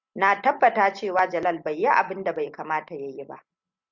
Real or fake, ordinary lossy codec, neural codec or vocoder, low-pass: real; Opus, 64 kbps; none; 7.2 kHz